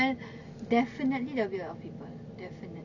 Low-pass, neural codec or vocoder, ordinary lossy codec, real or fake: 7.2 kHz; none; none; real